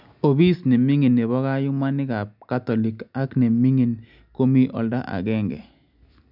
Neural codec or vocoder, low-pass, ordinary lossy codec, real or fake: none; 5.4 kHz; none; real